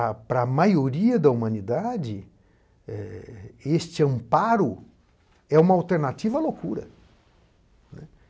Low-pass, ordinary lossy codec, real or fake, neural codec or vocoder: none; none; real; none